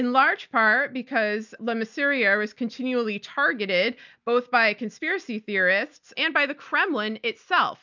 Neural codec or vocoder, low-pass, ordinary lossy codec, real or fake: none; 7.2 kHz; MP3, 64 kbps; real